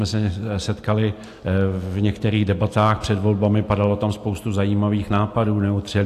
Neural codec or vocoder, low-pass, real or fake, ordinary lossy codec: none; 14.4 kHz; real; AAC, 64 kbps